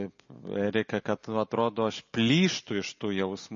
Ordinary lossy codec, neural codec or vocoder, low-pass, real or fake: MP3, 32 kbps; none; 7.2 kHz; real